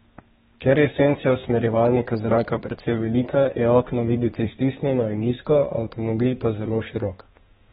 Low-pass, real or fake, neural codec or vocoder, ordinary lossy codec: 14.4 kHz; fake; codec, 32 kHz, 1.9 kbps, SNAC; AAC, 16 kbps